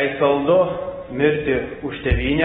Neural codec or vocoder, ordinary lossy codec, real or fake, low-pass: none; AAC, 16 kbps; real; 10.8 kHz